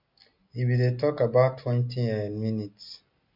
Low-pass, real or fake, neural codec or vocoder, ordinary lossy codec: 5.4 kHz; real; none; none